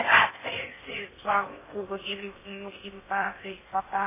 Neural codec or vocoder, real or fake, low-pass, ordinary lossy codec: codec, 16 kHz in and 24 kHz out, 0.6 kbps, FocalCodec, streaming, 4096 codes; fake; 3.6 kHz; MP3, 16 kbps